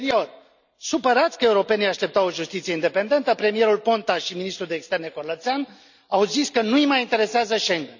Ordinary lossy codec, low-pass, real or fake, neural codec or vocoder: none; 7.2 kHz; real; none